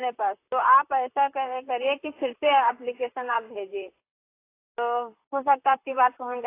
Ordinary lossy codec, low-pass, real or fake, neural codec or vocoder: AAC, 24 kbps; 3.6 kHz; fake; vocoder, 44.1 kHz, 128 mel bands, Pupu-Vocoder